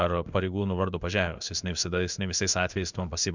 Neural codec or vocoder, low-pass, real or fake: codec, 16 kHz in and 24 kHz out, 1 kbps, XY-Tokenizer; 7.2 kHz; fake